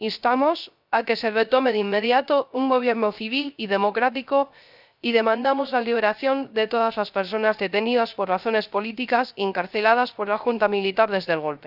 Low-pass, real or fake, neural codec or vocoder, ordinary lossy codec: 5.4 kHz; fake; codec, 16 kHz, 0.3 kbps, FocalCodec; none